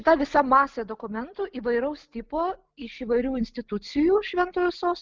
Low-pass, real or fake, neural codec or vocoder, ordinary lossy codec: 7.2 kHz; real; none; Opus, 24 kbps